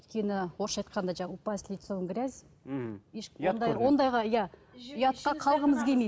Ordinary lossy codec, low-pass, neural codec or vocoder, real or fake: none; none; none; real